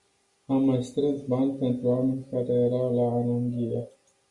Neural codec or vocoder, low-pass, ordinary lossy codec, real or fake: none; 10.8 kHz; Opus, 64 kbps; real